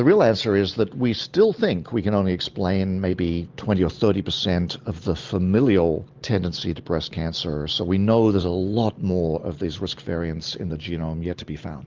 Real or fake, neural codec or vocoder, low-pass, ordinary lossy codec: real; none; 7.2 kHz; Opus, 32 kbps